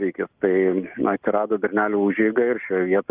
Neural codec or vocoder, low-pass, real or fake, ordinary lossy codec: none; 3.6 kHz; real; Opus, 24 kbps